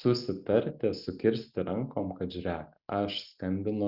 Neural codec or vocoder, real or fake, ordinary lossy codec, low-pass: none; real; Opus, 64 kbps; 5.4 kHz